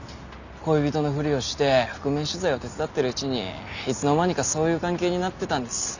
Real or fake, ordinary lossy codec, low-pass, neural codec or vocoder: real; none; 7.2 kHz; none